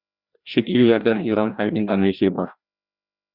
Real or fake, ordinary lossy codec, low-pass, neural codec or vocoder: fake; Opus, 64 kbps; 5.4 kHz; codec, 16 kHz, 1 kbps, FreqCodec, larger model